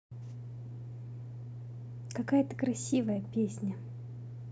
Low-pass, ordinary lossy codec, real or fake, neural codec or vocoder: none; none; real; none